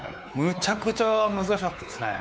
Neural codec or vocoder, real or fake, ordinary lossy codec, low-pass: codec, 16 kHz, 4 kbps, X-Codec, WavLM features, trained on Multilingual LibriSpeech; fake; none; none